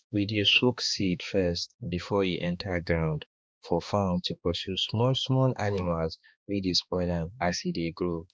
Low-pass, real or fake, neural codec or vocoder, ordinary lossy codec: none; fake; codec, 16 kHz, 2 kbps, X-Codec, HuBERT features, trained on balanced general audio; none